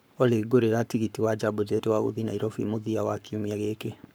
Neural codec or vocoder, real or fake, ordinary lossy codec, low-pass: codec, 44.1 kHz, 7.8 kbps, Pupu-Codec; fake; none; none